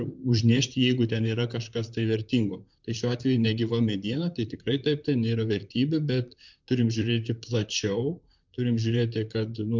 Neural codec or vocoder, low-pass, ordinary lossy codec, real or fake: vocoder, 44.1 kHz, 128 mel bands, Pupu-Vocoder; 7.2 kHz; MP3, 64 kbps; fake